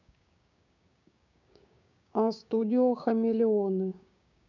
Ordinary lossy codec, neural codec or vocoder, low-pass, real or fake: none; codec, 16 kHz, 6 kbps, DAC; 7.2 kHz; fake